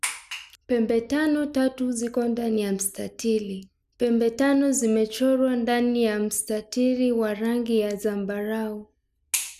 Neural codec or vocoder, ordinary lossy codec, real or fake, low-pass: none; none; real; 14.4 kHz